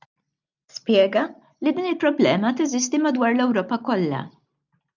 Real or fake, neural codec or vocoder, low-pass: real; none; 7.2 kHz